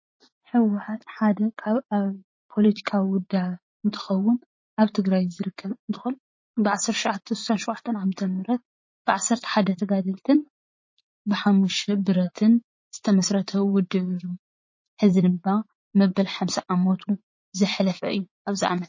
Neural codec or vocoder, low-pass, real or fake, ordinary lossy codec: vocoder, 44.1 kHz, 80 mel bands, Vocos; 7.2 kHz; fake; MP3, 32 kbps